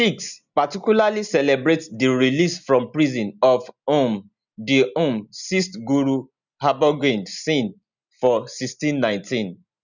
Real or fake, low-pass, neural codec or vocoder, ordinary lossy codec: real; 7.2 kHz; none; none